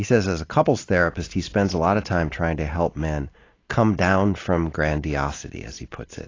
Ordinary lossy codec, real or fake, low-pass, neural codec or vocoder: AAC, 32 kbps; real; 7.2 kHz; none